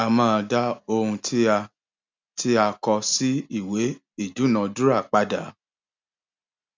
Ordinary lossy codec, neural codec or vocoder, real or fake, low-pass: MP3, 64 kbps; none; real; 7.2 kHz